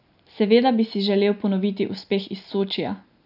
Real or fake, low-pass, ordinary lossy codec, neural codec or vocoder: real; 5.4 kHz; none; none